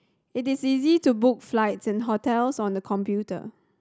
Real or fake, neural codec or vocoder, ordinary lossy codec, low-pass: real; none; none; none